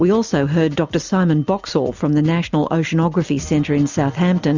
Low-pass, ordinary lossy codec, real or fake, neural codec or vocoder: 7.2 kHz; Opus, 64 kbps; real; none